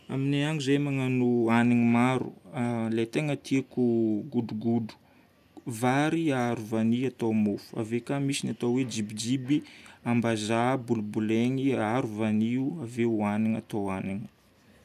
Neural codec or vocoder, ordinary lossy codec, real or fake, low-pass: none; none; real; 14.4 kHz